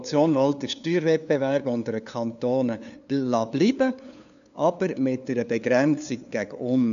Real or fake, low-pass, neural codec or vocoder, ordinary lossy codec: fake; 7.2 kHz; codec, 16 kHz, 2 kbps, FunCodec, trained on LibriTTS, 25 frames a second; none